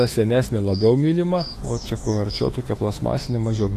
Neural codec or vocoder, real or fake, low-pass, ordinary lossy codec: autoencoder, 48 kHz, 32 numbers a frame, DAC-VAE, trained on Japanese speech; fake; 14.4 kHz; AAC, 48 kbps